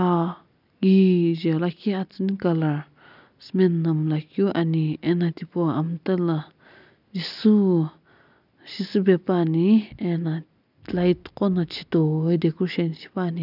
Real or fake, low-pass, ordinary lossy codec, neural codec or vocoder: real; 5.4 kHz; none; none